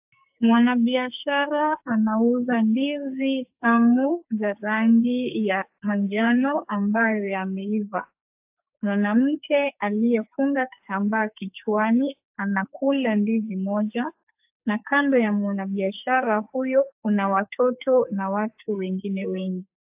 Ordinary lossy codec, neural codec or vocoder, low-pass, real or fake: AAC, 32 kbps; codec, 44.1 kHz, 2.6 kbps, SNAC; 3.6 kHz; fake